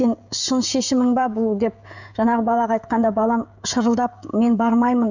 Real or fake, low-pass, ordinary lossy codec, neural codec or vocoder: fake; 7.2 kHz; none; codec, 16 kHz, 16 kbps, FreqCodec, smaller model